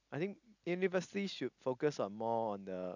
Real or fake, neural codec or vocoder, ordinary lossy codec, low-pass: real; none; none; 7.2 kHz